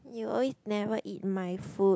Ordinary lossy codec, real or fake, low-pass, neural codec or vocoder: none; real; none; none